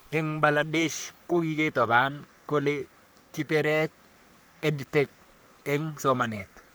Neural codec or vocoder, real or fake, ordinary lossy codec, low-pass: codec, 44.1 kHz, 3.4 kbps, Pupu-Codec; fake; none; none